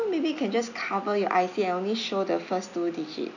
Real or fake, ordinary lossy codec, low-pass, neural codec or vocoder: real; none; 7.2 kHz; none